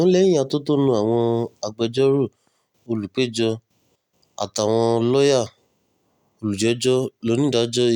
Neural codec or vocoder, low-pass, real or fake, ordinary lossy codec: none; 19.8 kHz; real; none